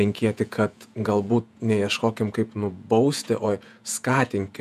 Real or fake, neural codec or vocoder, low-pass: fake; vocoder, 48 kHz, 128 mel bands, Vocos; 14.4 kHz